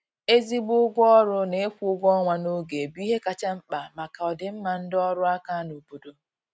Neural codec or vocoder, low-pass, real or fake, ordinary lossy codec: none; none; real; none